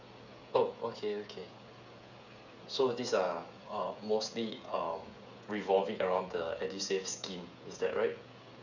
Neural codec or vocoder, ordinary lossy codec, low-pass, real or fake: codec, 16 kHz, 8 kbps, FreqCodec, smaller model; none; 7.2 kHz; fake